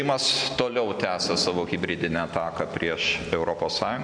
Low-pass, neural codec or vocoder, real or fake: 9.9 kHz; none; real